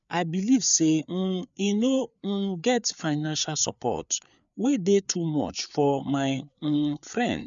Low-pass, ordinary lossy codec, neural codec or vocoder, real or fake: 7.2 kHz; MP3, 96 kbps; codec, 16 kHz, 4 kbps, FreqCodec, larger model; fake